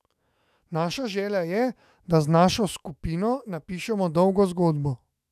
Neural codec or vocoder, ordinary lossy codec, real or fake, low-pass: autoencoder, 48 kHz, 128 numbers a frame, DAC-VAE, trained on Japanese speech; none; fake; 14.4 kHz